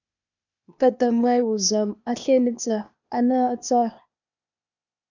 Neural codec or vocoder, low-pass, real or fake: codec, 16 kHz, 0.8 kbps, ZipCodec; 7.2 kHz; fake